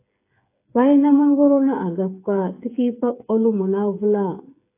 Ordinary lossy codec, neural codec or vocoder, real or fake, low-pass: AAC, 24 kbps; codec, 16 kHz, 8 kbps, FreqCodec, smaller model; fake; 3.6 kHz